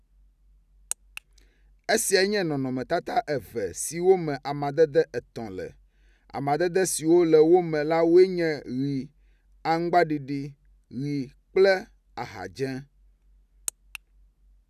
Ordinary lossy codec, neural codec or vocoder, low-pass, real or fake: none; none; 14.4 kHz; real